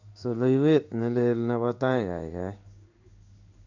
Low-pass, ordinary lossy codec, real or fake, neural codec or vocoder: 7.2 kHz; none; fake; codec, 16 kHz in and 24 kHz out, 1 kbps, XY-Tokenizer